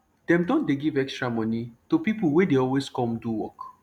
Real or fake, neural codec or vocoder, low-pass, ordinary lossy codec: real; none; 19.8 kHz; none